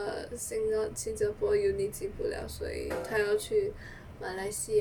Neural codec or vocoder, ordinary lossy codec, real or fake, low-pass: none; none; real; 19.8 kHz